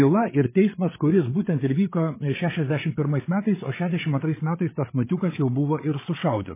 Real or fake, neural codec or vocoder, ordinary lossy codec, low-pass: fake; codec, 16 kHz, 8 kbps, FreqCodec, larger model; MP3, 16 kbps; 3.6 kHz